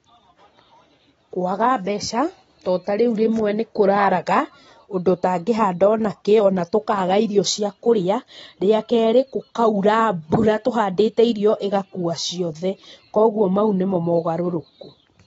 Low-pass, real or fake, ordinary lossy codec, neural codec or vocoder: 19.8 kHz; real; AAC, 24 kbps; none